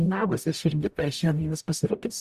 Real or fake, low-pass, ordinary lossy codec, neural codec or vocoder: fake; 14.4 kHz; Opus, 64 kbps; codec, 44.1 kHz, 0.9 kbps, DAC